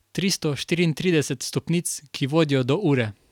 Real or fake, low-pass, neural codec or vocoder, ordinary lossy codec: real; 19.8 kHz; none; none